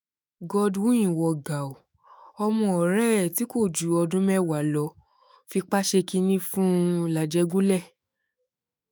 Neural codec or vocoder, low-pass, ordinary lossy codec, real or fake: autoencoder, 48 kHz, 128 numbers a frame, DAC-VAE, trained on Japanese speech; none; none; fake